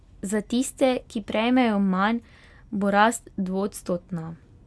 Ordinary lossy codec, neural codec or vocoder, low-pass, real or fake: none; none; none; real